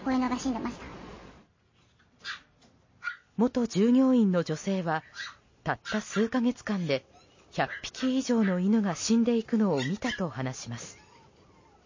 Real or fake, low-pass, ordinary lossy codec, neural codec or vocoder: real; 7.2 kHz; MP3, 32 kbps; none